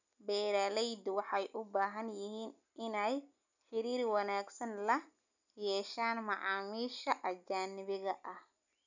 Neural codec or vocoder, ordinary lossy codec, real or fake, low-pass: none; none; real; 7.2 kHz